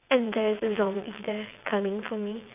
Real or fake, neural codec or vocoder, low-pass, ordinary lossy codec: fake; vocoder, 22.05 kHz, 80 mel bands, WaveNeXt; 3.6 kHz; none